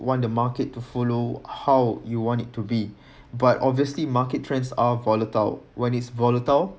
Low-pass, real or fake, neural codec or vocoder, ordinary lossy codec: none; real; none; none